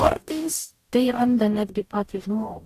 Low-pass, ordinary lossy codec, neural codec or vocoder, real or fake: 14.4 kHz; AAC, 64 kbps; codec, 44.1 kHz, 0.9 kbps, DAC; fake